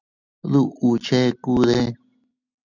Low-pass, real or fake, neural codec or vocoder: 7.2 kHz; real; none